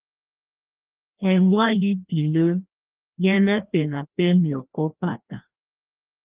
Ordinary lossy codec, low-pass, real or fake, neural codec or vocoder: Opus, 32 kbps; 3.6 kHz; fake; codec, 16 kHz, 1 kbps, FreqCodec, larger model